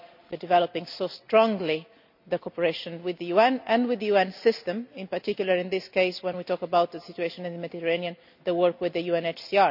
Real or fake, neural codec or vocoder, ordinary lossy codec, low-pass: real; none; none; 5.4 kHz